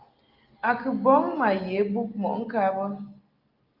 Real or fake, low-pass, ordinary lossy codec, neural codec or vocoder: real; 5.4 kHz; Opus, 24 kbps; none